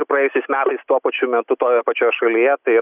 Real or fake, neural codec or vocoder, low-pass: real; none; 3.6 kHz